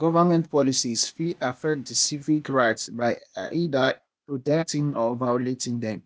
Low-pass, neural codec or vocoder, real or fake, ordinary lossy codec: none; codec, 16 kHz, 0.8 kbps, ZipCodec; fake; none